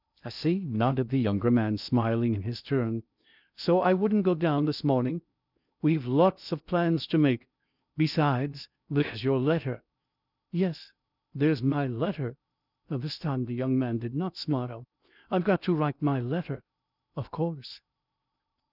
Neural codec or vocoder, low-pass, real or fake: codec, 16 kHz in and 24 kHz out, 0.6 kbps, FocalCodec, streaming, 2048 codes; 5.4 kHz; fake